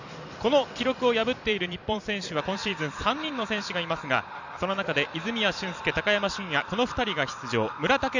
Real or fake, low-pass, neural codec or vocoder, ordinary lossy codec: fake; 7.2 kHz; vocoder, 44.1 kHz, 128 mel bands every 256 samples, BigVGAN v2; none